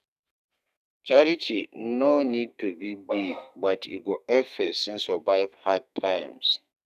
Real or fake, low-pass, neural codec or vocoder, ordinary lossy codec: fake; 14.4 kHz; codec, 32 kHz, 1.9 kbps, SNAC; none